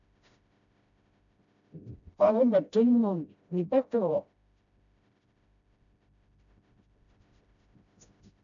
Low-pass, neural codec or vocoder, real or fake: 7.2 kHz; codec, 16 kHz, 0.5 kbps, FreqCodec, smaller model; fake